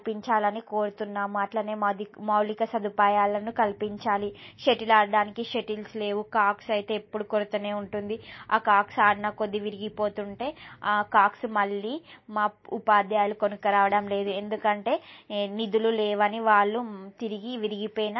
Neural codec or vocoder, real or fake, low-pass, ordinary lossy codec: none; real; 7.2 kHz; MP3, 24 kbps